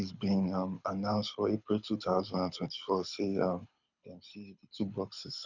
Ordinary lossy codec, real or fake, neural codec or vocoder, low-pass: none; fake; codec, 24 kHz, 6 kbps, HILCodec; 7.2 kHz